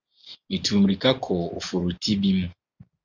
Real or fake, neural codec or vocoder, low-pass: real; none; 7.2 kHz